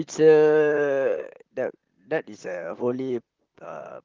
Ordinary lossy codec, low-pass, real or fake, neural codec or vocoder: Opus, 24 kbps; 7.2 kHz; fake; vocoder, 44.1 kHz, 128 mel bands, Pupu-Vocoder